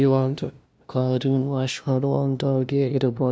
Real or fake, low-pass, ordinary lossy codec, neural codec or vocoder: fake; none; none; codec, 16 kHz, 0.5 kbps, FunCodec, trained on LibriTTS, 25 frames a second